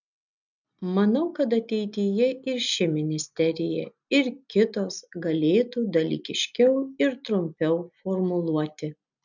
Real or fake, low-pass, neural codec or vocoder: real; 7.2 kHz; none